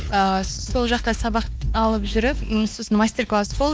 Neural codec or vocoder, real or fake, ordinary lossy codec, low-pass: codec, 16 kHz, 2 kbps, X-Codec, WavLM features, trained on Multilingual LibriSpeech; fake; none; none